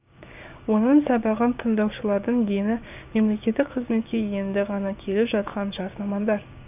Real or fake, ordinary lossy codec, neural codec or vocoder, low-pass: fake; none; codec, 16 kHz, 6 kbps, DAC; 3.6 kHz